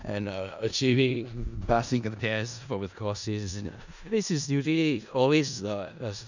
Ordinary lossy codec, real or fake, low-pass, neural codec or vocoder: none; fake; 7.2 kHz; codec, 16 kHz in and 24 kHz out, 0.4 kbps, LongCat-Audio-Codec, four codebook decoder